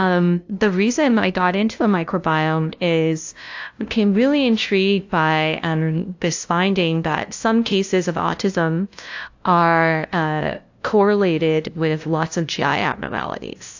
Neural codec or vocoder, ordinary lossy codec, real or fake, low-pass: codec, 16 kHz, 0.5 kbps, FunCodec, trained on LibriTTS, 25 frames a second; AAC, 48 kbps; fake; 7.2 kHz